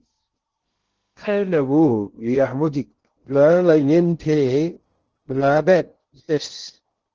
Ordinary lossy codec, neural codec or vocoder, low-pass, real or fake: Opus, 16 kbps; codec, 16 kHz in and 24 kHz out, 0.6 kbps, FocalCodec, streaming, 2048 codes; 7.2 kHz; fake